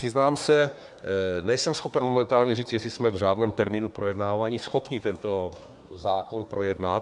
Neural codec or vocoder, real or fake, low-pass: codec, 24 kHz, 1 kbps, SNAC; fake; 10.8 kHz